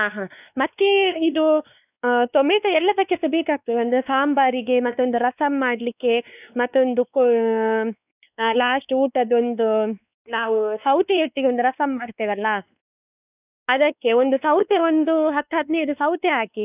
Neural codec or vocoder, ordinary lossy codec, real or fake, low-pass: codec, 16 kHz, 2 kbps, X-Codec, WavLM features, trained on Multilingual LibriSpeech; none; fake; 3.6 kHz